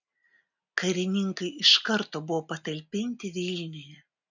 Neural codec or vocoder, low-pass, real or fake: none; 7.2 kHz; real